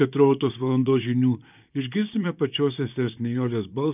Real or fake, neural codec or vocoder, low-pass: fake; vocoder, 44.1 kHz, 128 mel bands, Pupu-Vocoder; 3.6 kHz